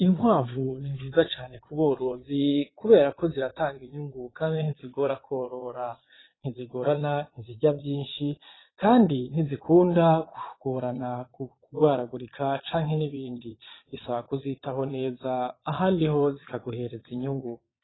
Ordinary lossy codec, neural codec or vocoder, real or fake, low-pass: AAC, 16 kbps; vocoder, 22.05 kHz, 80 mel bands, Vocos; fake; 7.2 kHz